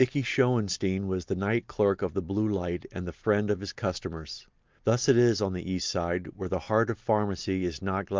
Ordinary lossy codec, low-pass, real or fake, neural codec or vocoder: Opus, 24 kbps; 7.2 kHz; real; none